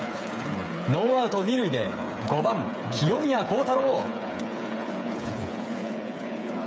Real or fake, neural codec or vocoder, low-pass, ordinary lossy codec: fake; codec, 16 kHz, 8 kbps, FreqCodec, smaller model; none; none